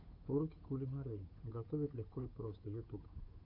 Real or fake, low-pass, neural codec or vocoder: fake; 5.4 kHz; codec, 16 kHz, 8 kbps, FunCodec, trained on Chinese and English, 25 frames a second